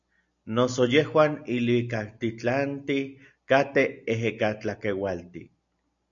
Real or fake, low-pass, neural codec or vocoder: real; 7.2 kHz; none